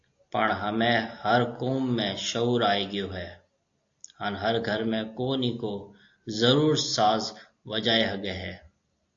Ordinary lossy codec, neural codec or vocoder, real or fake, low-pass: AAC, 48 kbps; none; real; 7.2 kHz